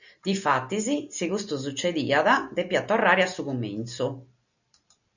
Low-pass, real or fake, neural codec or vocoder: 7.2 kHz; real; none